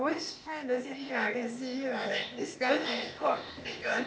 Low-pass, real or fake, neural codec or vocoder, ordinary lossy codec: none; fake; codec, 16 kHz, 0.8 kbps, ZipCodec; none